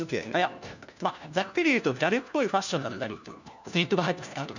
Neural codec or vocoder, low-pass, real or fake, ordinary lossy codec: codec, 16 kHz, 1 kbps, FunCodec, trained on LibriTTS, 50 frames a second; 7.2 kHz; fake; none